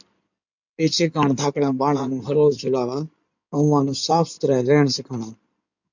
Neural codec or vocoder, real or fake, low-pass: codec, 16 kHz in and 24 kHz out, 2.2 kbps, FireRedTTS-2 codec; fake; 7.2 kHz